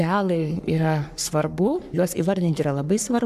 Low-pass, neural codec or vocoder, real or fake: 14.4 kHz; codec, 44.1 kHz, 3.4 kbps, Pupu-Codec; fake